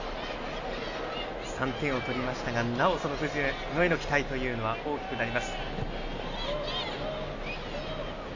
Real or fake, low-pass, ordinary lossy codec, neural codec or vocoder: real; 7.2 kHz; AAC, 48 kbps; none